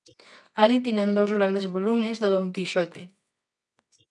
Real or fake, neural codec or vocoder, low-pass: fake; codec, 24 kHz, 0.9 kbps, WavTokenizer, medium music audio release; 10.8 kHz